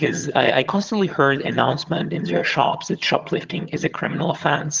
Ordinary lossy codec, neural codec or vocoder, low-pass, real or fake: Opus, 32 kbps; vocoder, 22.05 kHz, 80 mel bands, HiFi-GAN; 7.2 kHz; fake